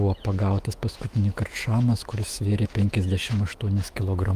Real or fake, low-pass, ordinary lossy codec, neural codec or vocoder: fake; 14.4 kHz; Opus, 32 kbps; autoencoder, 48 kHz, 128 numbers a frame, DAC-VAE, trained on Japanese speech